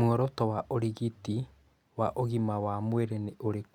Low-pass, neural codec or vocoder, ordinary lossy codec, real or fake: 19.8 kHz; none; none; real